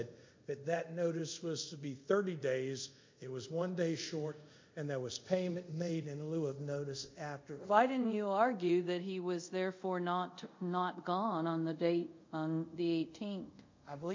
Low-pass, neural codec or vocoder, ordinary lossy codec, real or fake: 7.2 kHz; codec, 24 kHz, 0.5 kbps, DualCodec; MP3, 48 kbps; fake